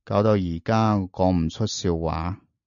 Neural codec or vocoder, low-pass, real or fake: none; 7.2 kHz; real